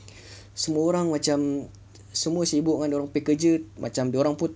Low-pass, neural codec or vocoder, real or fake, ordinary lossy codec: none; none; real; none